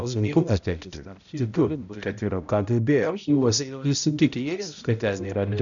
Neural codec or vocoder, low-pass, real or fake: codec, 16 kHz, 0.5 kbps, X-Codec, HuBERT features, trained on balanced general audio; 7.2 kHz; fake